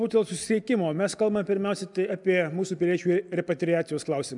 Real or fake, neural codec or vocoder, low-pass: real; none; 10.8 kHz